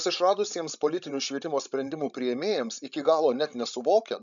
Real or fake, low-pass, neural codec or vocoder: fake; 7.2 kHz; codec, 16 kHz, 16 kbps, FreqCodec, larger model